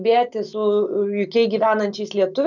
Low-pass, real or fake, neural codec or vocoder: 7.2 kHz; real; none